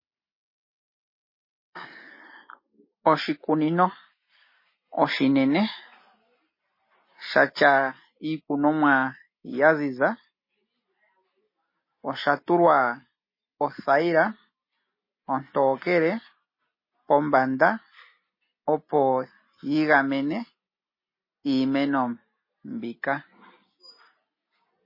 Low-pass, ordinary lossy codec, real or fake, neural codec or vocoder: 5.4 kHz; MP3, 24 kbps; real; none